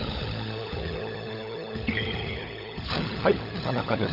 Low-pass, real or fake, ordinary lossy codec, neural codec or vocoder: 5.4 kHz; fake; none; codec, 16 kHz, 16 kbps, FunCodec, trained on LibriTTS, 50 frames a second